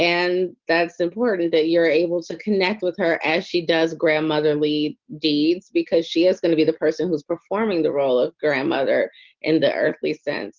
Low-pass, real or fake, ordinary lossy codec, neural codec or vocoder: 7.2 kHz; real; Opus, 32 kbps; none